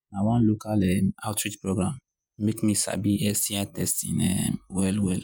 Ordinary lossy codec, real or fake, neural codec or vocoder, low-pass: none; fake; vocoder, 48 kHz, 128 mel bands, Vocos; none